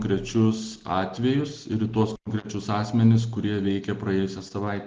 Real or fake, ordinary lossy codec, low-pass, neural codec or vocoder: real; Opus, 16 kbps; 7.2 kHz; none